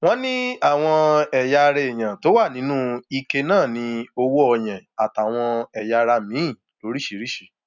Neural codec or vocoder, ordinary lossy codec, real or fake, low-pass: none; none; real; 7.2 kHz